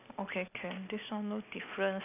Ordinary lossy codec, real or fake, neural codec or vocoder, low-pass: none; real; none; 3.6 kHz